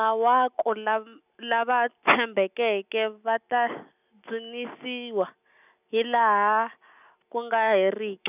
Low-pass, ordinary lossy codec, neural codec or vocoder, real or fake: 3.6 kHz; none; none; real